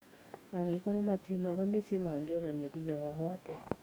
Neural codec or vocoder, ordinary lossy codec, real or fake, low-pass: codec, 44.1 kHz, 2.6 kbps, DAC; none; fake; none